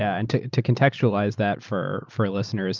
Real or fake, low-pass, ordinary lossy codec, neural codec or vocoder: real; 7.2 kHz; Opus, 24 kbps; none